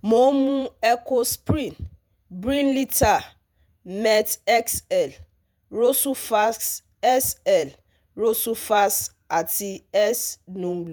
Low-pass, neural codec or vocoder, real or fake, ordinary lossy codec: none; vocoder, 48 kHz, 128 mel bands, Vocos; fake; none